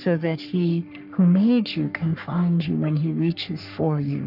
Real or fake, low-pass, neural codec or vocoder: fake; 5.4 kHz; codec, 44.1 kHz, 3.4 kbps, Pupu-Codec